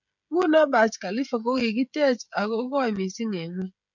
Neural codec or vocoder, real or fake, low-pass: codec, 16 kHz, 16 kbps, FreqCodec, smaller model; fake; 7.2 kHz